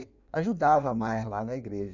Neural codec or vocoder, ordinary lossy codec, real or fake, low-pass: codec, 16 kHz in and 24 kHz out, 1.1 kbps, FireRedTTS-2 codec; none; fake; 7.2 kHz